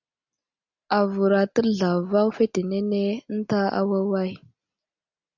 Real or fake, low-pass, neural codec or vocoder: real; 7.2 kHz; none